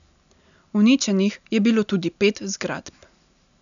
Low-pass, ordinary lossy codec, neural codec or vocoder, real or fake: 7.2 kHz; none; none; real